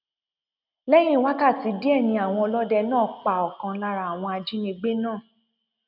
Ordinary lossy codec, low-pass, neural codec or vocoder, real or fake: none; 5.4 kHz; none; real